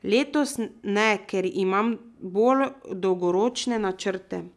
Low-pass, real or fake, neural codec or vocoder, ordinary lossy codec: none; real; none; none